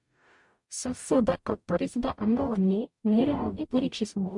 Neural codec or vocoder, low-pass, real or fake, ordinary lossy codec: codec, 44.1 kHz, 0.9 kbps, DAC; 10.8 kHz; fake; MP3, 64 kbps